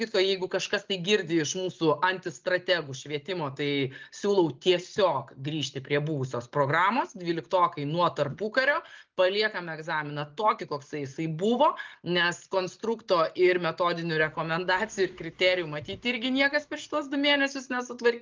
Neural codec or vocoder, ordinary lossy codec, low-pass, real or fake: none; Opus, 32 kbps; 7.2 kHz; real